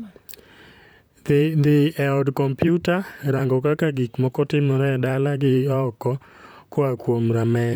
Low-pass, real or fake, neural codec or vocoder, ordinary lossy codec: none; fake; vocoder, 44.1 kHz, 128 mel bands, Pupu-Vocoder; none